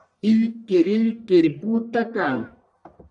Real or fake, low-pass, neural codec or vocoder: fake; 10.8 kHz; codec, 44.1 kHz, 1.7 kbps, Pupu-Codec